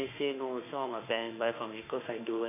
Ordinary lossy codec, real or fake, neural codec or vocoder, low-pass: none; fake; autoencoder, 48 kHz, 32 numbers a frame, DAC-VAE, trained on Japanese speech; 3.6 kHz